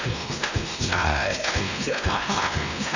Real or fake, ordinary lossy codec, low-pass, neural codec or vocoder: fake; none; 7.2 kHz; codec, 16 kHz, 1 kbps, X-Codec, WavLM features, trained on Multilingual LibriSpeech